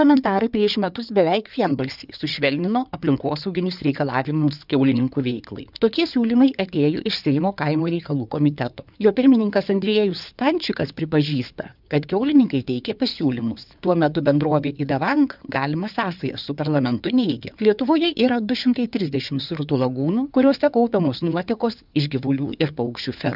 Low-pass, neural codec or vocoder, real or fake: 5.4 kHz; codec, 16 kHz in and 24 kHz out, 2.2 kbps, FireRedTTS-2 codec; fake